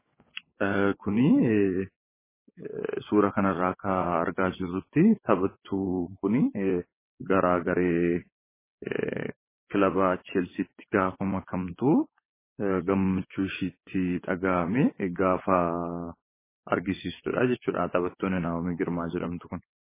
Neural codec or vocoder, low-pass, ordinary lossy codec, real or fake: vocoder, 22.05 kHz, 80 mel bands, WaveNeXt; 3.6 kHz; MP3, 16 kbps; fake